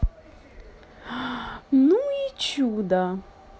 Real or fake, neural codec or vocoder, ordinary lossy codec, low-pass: real; none; none; none